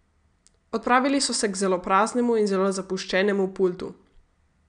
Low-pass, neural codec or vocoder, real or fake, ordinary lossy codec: 9.9 kHz; none; real; none